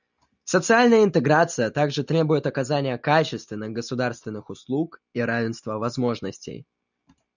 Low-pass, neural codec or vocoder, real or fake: 7.2 kHz; none; real